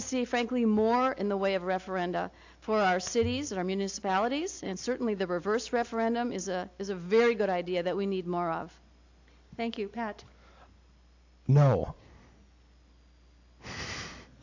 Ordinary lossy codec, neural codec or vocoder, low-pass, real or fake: AAC, 48 kbps; none; 7.2 kHz; real